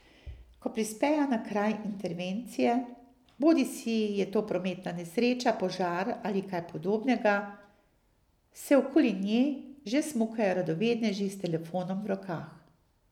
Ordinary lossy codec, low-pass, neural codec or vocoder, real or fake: none; 19.8 kHz; none; real